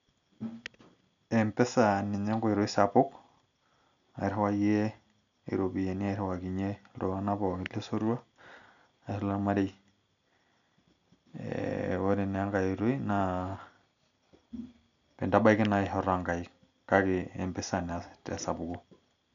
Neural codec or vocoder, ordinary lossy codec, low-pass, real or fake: none; none; 7.2 kHz; real